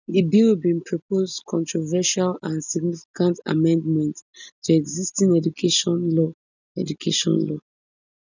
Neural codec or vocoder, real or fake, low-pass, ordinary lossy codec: none; real; 7.2 kHz; none